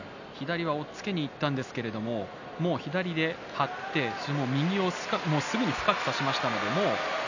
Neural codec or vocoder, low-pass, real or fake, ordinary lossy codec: none; 7.2 kHz; real; none